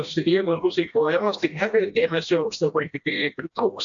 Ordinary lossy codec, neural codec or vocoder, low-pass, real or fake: AAC, 64 kbps; codec, 16 kHz, 1 kbps, FreqCodec, smaller model; 7.2 kHz; fake